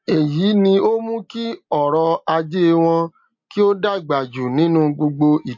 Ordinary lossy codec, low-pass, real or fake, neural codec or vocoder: MP3, 48 kbps; 7.2 kHz; real; none